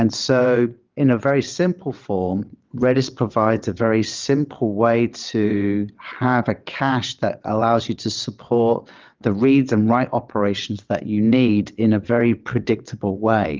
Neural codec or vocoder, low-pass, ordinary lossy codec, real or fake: vocoder, 22.05 kHz, 80 mel bands, WaveNeXt; 7.2 kHz; Opus, 24 kbps; fake